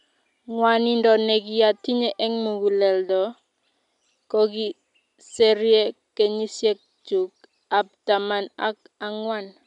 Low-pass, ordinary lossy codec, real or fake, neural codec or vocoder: 10.8 kHz; none; real; none